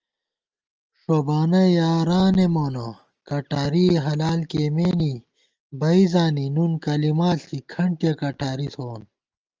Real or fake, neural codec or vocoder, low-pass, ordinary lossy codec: real; none; 7.2 kHz; Opus, 24 kbps